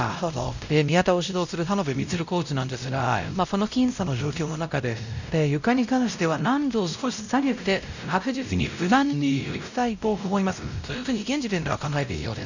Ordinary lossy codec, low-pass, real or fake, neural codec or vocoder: none; 7.2 kHz; fake; codec, 16 kHz, 0.5 kbps, X-Codec, WavLM features, trained on Multilingual LibriSpeech